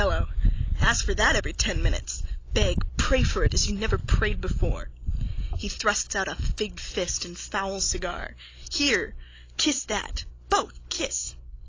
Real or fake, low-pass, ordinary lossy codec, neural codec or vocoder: real; 7.2 kHz; AAC, 32 kbps; none